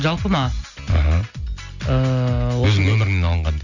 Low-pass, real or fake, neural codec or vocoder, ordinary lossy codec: 7.2 kHz; real; none; none